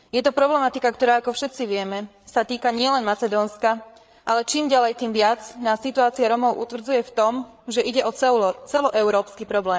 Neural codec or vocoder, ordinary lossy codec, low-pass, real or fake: codec, 16 kHz, 8 kbps, FreqCodec, larger model; none; none; fake